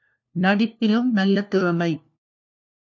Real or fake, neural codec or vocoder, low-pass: fake; codec, 16 kHz, 1 kbps, FunCodec, trained on LibriTTS, 50 frames a second; 7.2 kHz